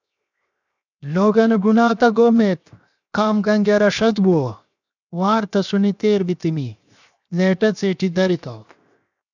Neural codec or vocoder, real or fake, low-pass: codec, 16 kHz, 0.7 kbps, FocalCodec; fake; 7.2 kHz